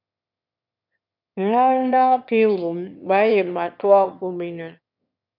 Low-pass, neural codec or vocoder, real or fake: 5.4 kHz; autoencoder, 22.05 kHz, a latent of 192 numbers a frame, VITS, trained on one speaker; fake